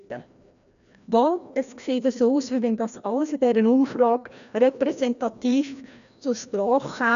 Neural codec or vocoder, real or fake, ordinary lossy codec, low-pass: codec, 16 kHz, 1 kbps, FreqCodec, larger model; fake; none; 7.2 kHz